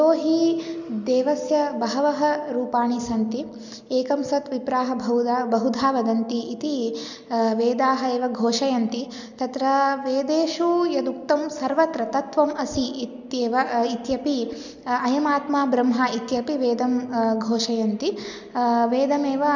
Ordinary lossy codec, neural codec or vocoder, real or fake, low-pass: none; none; real; 7.2 kHz